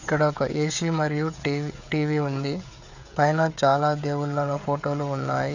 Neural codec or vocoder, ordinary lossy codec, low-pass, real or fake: codec, 16 kHz, 16 kbps, FreqCodec, smaller model; none; 7.2 kHz; fake